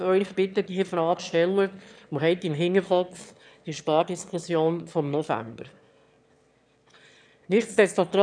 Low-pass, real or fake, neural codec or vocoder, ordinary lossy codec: 9.9 kHz; fake; autoencoder, 22.05 kHz, a latent of 192 numbers a frame, VITS, trained on one speaker; none